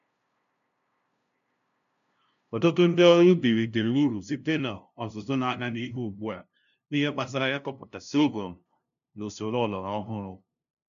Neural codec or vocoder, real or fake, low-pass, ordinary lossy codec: codec, 16 kHz, 0.5 kbps, FunCodec, trained on LibriTTS, 25 frames a second; fake; 7.2 kHz; none